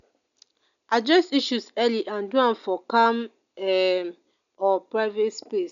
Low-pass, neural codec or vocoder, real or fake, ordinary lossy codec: 7.2 kHz; none; real; none